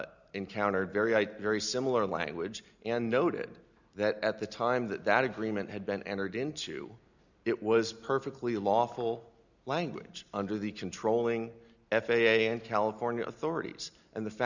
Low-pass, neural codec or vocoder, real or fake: 7.2 kHz; none; real